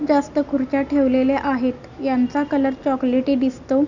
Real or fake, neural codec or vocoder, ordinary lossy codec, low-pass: real; none; none; 7.2 kHz